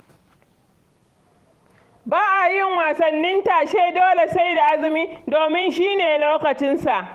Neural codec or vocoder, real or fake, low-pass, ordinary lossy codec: vocoder, 44.1 kHz, 128 mel bands every 512 samples, BigVGAN v2; fake; 14.4 kHz; Opus, 24 kbps